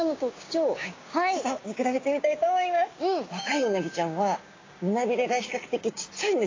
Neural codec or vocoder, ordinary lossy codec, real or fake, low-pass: codec, 16 kHz, 6 kbps, DAC; AAC, 32 kbps; fake; 7.2 kHz